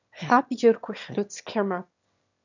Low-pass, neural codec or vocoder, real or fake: 7.2 kHz; autoencoder, 22.05 kHz, a latent of 192 numbers a frame, VITS, trained on one speaker; fake